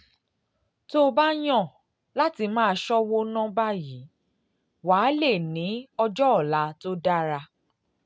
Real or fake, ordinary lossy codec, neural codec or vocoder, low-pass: real; none; none; none